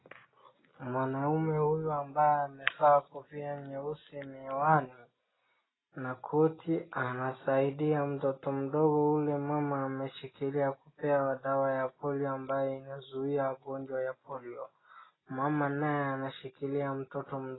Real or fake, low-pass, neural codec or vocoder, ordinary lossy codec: real; 7.2 kHz; none; AAC, 16 kbps